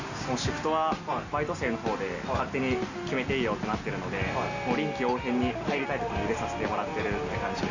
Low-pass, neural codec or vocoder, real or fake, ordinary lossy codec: 7.2 kHz; none; real; Opus, 64 kbps